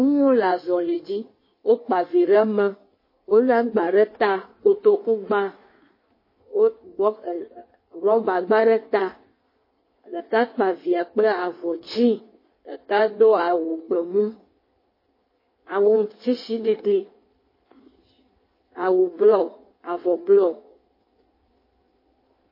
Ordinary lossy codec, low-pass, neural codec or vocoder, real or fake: MP3, 24 kbps; 5.4 kHz; codec, 16 kHz in and 24 kHz out, 1.1 kbps, FireRedTTS-2 codec; fake